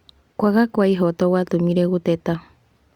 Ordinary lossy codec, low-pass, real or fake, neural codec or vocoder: Opus, 64 kbps; 19.8 kHz; real; none